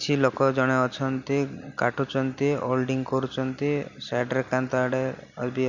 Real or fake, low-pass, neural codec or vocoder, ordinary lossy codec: real; 7.2 kHz; none; none